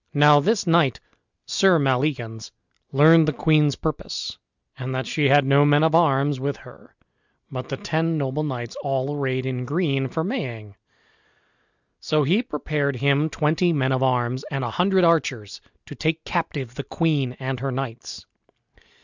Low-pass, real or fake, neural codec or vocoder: 7.2 kHz; real; none